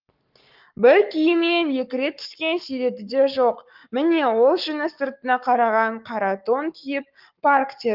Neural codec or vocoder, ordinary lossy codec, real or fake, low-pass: codec, 44.1 kHz, 7.8 kbps, Pupu-Codec; Opus, 32 kbps; fake; 5.4 kHz